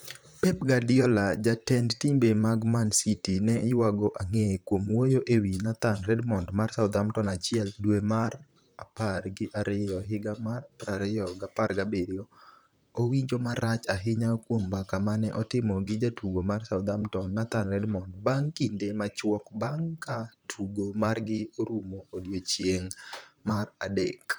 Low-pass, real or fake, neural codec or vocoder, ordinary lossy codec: none; fake; vocoder, 44.1 kHz, 128 mel bands, Pupu-Vocoder; none